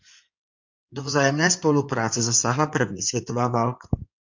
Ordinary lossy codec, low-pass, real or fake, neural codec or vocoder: MP3, 64 kbps; 7.2 kHz; fake; codec, 16 kHz in and 24 kHz out, 2.2 kbps, FireRedTTS-2 codec